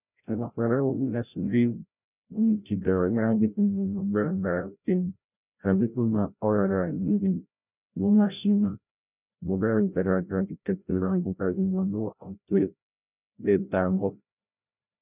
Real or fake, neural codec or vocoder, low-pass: fake; codec, 16 kHz, 0.5 kbps, FreqCodec, larger model; 3.6 kHz